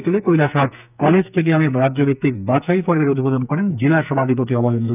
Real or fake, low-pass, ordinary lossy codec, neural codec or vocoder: fake; 3.6 kHz; none; codec, 44.1 kHz, 2.6 kbps, SNAC